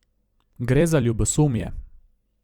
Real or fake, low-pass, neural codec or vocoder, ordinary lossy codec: fake; 19.8 kHz; vocoder, 44.1 kHz, 128 mel bands every 256 samples, BigVGAN v2; Opus, 64 kbps